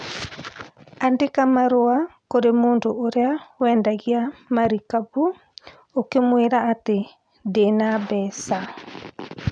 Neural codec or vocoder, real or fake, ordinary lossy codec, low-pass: none; real; none; 9.9 kHz